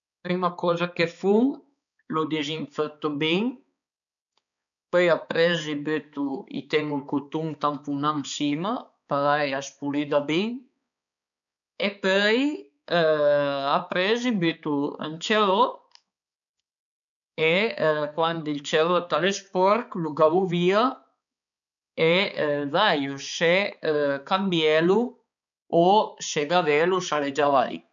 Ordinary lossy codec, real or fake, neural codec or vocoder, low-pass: none; fake; codec, 16 kHz, 4 kbps, X-Codec, HuBERT features, trained on balanced general audio; 7.2 kHz